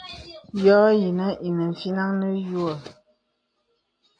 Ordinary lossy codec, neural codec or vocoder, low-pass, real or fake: AAC, 64 kbps; none; 9.9 kHz; real